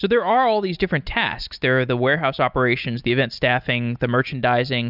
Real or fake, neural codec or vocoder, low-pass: real; none; 5.4 kHz